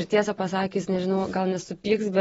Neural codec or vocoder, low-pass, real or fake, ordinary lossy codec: none; 19.8 kHz; real; AAC, 24 kbps